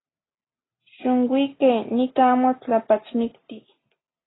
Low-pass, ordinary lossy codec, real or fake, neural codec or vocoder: 7.2 kHz; AAC, 16 kbps; real; none